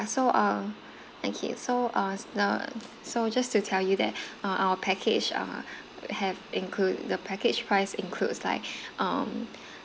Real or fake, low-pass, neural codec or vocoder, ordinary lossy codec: real; none; none; none